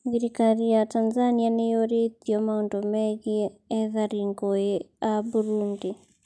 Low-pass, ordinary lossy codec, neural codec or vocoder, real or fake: none; none; none; real